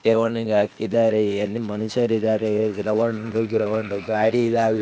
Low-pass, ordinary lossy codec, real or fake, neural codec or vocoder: none; none; fake; codec, 16 kHz, 0.8 kbps, ZipCodec